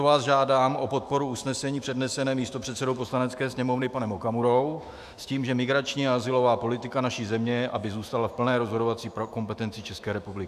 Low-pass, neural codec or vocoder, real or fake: 14.4 kHz; autoencoder, 48 kHz, 128 numbers a frame, DAC-VAE, trained on Japanese speech; fake